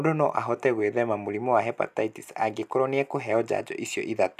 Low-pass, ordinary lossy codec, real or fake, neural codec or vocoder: 14.4 kHz; none; real; none